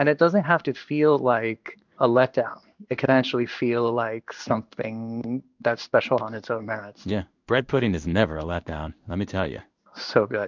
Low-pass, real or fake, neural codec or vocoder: 7.2 kHz; fake; codec, 16 kHz in and 24 kHz out, 1 kbps, XY-Tokenizer